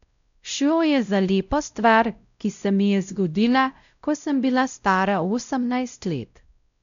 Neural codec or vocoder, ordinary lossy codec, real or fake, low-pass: codec, 16 kHz, 0.5 kbps, X-Codec, WavLM features, trained on Multilingual LibriSpeech; none; fake; 7.2 kHz